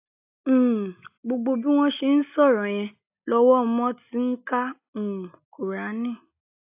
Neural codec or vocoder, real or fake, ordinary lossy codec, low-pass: none; real; AAC, 32 kbps; 3.6 kHz